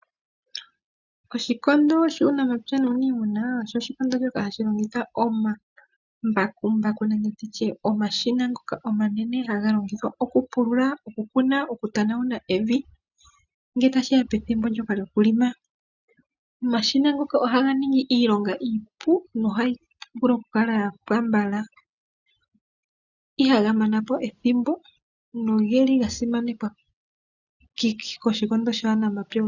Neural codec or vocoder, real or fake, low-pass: none; real; 7.2 kHz